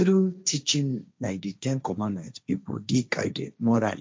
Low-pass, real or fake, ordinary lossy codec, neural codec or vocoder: none; fake; none; codec, 16 kHz, 1.1 kbps, Voila-Tokenizer